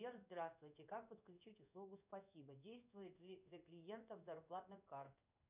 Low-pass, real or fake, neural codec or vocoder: 3.6 kHz; fake; codec, 16 kHz in and 24 kHz out, 1 kbps, XY-Tokenizer